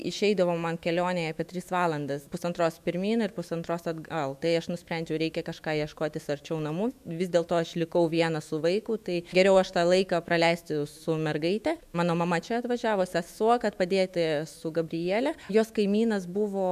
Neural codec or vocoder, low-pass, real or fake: autoencoder, 48 kHz, 128 numbers a frame, DAC-VAE, trained on Japanese speech; 14.4 kHz; fake